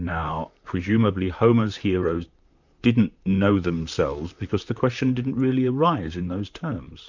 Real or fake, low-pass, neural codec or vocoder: fake; 7.2 kHz; vocoder, 44.1 kHz, 128 mel bands, Pupu-Vocoder